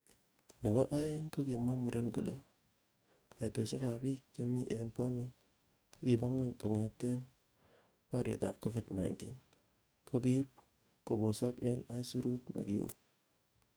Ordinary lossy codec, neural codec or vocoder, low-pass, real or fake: none; codec, 44.1 kHz, 2.6 kbps, DAC; none; fake